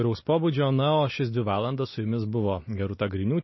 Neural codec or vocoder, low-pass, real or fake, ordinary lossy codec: none; 7.2 kHz; real; MP3, 24 kbps